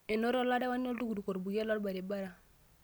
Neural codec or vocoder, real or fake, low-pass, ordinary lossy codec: none; real; none; none